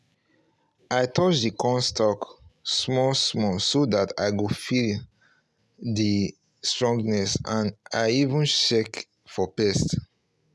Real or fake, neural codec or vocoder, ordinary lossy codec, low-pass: real; none; none; none